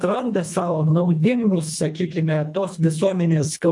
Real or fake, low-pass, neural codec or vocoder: fake; 10.8 kHz; codec, 24 kHz, 1.5 kbps, HILCodec